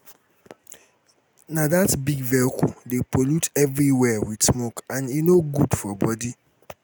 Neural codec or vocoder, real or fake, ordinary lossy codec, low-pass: none; real; none; none